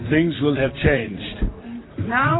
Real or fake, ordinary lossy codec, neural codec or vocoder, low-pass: fake; AAC, 16 kbps; vocoder, 44.1 kHz, 128 mel bands, Pupu-Vocoder; 7.2 kHz